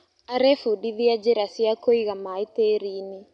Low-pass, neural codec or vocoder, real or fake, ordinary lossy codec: 10.8 kHz; none; real; none